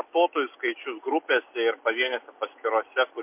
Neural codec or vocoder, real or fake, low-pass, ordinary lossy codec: none; real; 3.6 kHz; MP3, 32 kbps